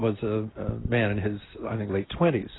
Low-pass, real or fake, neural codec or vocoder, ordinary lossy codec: 7.2 kHz; real; none; AAC, 16 kbps